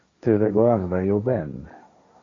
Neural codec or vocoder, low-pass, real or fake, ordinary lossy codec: codec, 16 kHz, 1.1 kbps, Voila-Tokenizer; 7.2 kHz; fake; AAC, 32 kbps